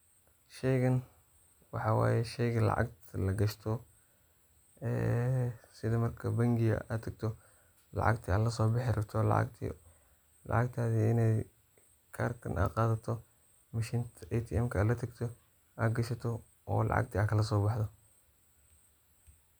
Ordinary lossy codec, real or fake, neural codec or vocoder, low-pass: none; real; none; none